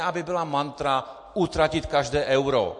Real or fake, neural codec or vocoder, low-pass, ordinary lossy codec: real; none; 10.8 kHz; MP3, 48 kbps